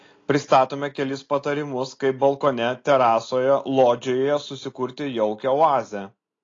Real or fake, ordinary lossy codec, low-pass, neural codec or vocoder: real; AAC, 32 kbps; 7.2 kHz; none